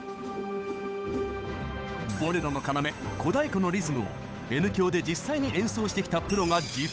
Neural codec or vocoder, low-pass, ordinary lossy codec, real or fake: codec, 16 kHz, 8 kbps, FunCodec, trained on Chinese and English, 25 frames a second; none; none; fake